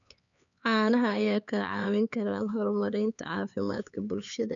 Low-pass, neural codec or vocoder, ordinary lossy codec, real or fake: 7.2 kHz; codec, 16 kHz, 4 kbps, X-Codec, HuBERT features, trained on LibriSpeech; none; fake